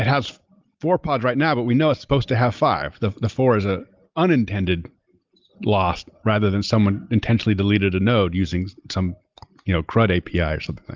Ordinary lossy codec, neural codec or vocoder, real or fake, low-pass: Opus, 32 kbps; none; real; 7.2 kHz